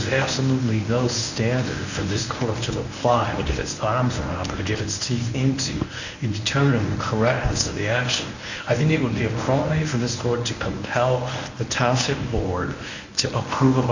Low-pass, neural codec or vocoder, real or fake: 7.2 kHz; codec, 24 kHz, 0.9 kbps, WavTokenizer, medium speech release version 1; fake